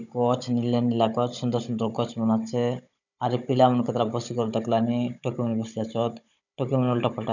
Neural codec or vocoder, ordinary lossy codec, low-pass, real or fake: codec, 16 kHz, 16 kbps, FunCodec, trained on Chinese and English, 50 frames a second; none; 7.2 kHz; fake